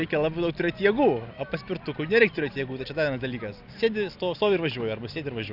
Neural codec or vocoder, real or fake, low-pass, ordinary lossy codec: none; real; 5.4 kHz; Opus, 64 kbps